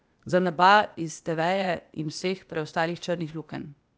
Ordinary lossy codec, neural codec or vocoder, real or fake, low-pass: none; codec, 16 kHz, 0.8 kbps, ZipCodec; fake; none